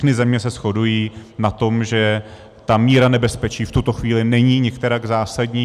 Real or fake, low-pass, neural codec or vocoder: real; 14.4 kHz; none